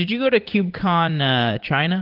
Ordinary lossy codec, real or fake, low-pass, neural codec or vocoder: Opus, 16 kbps; fake; 5.4 kHz; vocoder, 44.1 kHz, 80 mel bands, Vocos